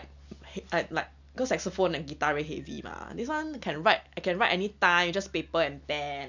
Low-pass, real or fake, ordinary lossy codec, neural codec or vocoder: 7.2 kHz; real; none; none